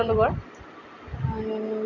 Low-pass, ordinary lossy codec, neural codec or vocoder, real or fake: 7.2 kHz; none; none; real